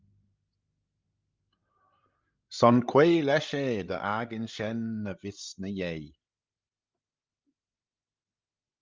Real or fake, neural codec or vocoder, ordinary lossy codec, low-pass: fake; codec, 16 kHz, 16 kbps, FreqCodec, larger model; Opus, 32 kbps; 7.2 kHz